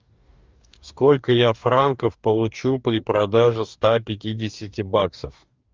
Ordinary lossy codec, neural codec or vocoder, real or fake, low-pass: Opus, 24 kbps; codec, 44.1 kHz, 2.6 kbps, DAC; fake; 7.2 kHz